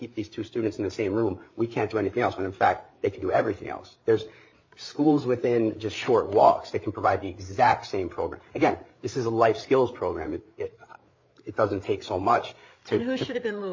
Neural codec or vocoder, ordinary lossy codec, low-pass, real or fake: codec, 16 kHz, 8 kbps, FreqCodec, larger model; MP3, 32 kbps; 7.2 kHz; fake